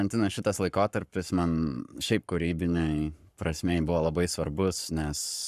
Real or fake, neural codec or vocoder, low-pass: fake; codec, 44.1 kHz, 7.8 kbps, Pupu-Codec; 14.4 kHz